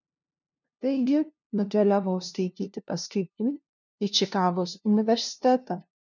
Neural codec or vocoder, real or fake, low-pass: codec, 16 kHz, 0.5 kbps, FunCodec, trained on LibriTTS, 25 frames a second; fake; 7.2 kHz